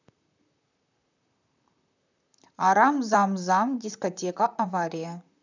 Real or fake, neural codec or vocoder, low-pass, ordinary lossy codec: fake; vocoder, 44.1 kHz, 128 mel bands, Pupu-Vocoder; 7.2 kHz; none